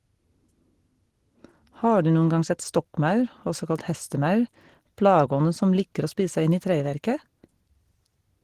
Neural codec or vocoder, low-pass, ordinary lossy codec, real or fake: none; 14.4 kHz; Opus, 16 kbps; real